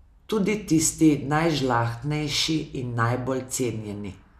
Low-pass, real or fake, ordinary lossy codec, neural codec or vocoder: 14.4 kHz; real; none; none